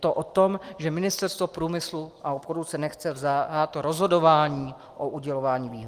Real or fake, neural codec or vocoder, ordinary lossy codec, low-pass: real; none; Opus, 24 kbps; 14.4 kHz